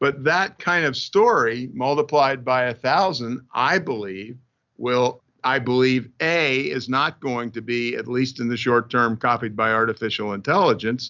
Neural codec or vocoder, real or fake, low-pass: none; real; 7.2 kHz